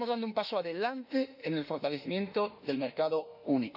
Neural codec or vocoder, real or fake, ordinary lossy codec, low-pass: autoencoder, 48 kHz, 32 numbers a frame, DAC-VAE, trained on Japanese speech; fake; none; 5.4 kHz